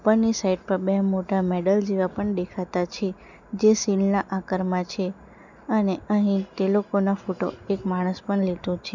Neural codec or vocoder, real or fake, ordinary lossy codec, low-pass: none; real; none; 7.2 kHz